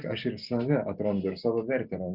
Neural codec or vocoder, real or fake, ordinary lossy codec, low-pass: none; real; Opus, 64 kbps; 5.4 kHz